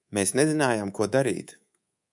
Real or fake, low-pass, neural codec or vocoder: fake; 10.8 kHz; codec, 24 kHz, 3.1 kbps, DualCodec